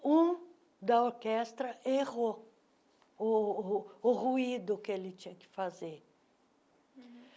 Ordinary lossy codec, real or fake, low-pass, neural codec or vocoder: none; real; none; none